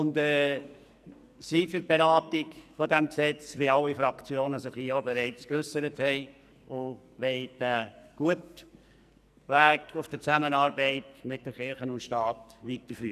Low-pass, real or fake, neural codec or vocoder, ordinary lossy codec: 14.4 kHz; fake; codec, 44.1 kHz, 2.6 kbps, SNAC; none